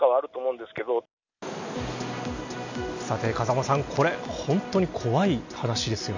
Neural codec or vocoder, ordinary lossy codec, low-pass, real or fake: none; none; 7.2 kHz; real